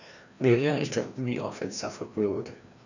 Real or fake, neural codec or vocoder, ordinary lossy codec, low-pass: fake; codec, 16 kHz, 1 kbps, FreqCodec, larger model; none; 7.2 kHz